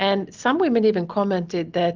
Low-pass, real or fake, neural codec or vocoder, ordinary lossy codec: 7.2 kHz; real; none; Opus, 24 kbps